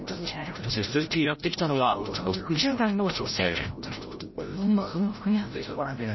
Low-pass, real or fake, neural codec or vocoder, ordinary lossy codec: 7.2 kHz; fake; codec, 16 kHz, 0.5 kbps, FreqCodec, larger model; MP3, 24 kbps